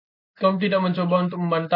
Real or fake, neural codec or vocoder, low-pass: real; none; 5.4 kHz